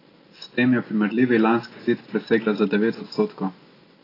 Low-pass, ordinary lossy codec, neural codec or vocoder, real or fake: 5.4 kHz; AAC, 24 kbps; none; real